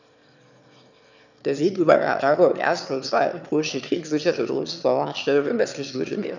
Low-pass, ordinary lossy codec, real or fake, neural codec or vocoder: 7.2 kHz; none; fake; autoencoder, 22.05 kHz, a latent of 192 numbers a frame, VITS, trained on one speaker